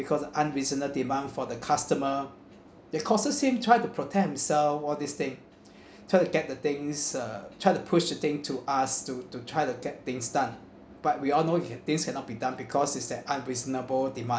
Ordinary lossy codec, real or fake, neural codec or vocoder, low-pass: none; real; none; none